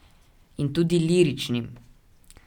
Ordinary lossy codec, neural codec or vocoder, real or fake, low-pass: none; none; real; 19.8 kHz